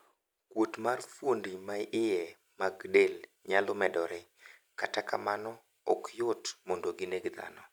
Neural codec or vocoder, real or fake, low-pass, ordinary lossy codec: none; real; none; none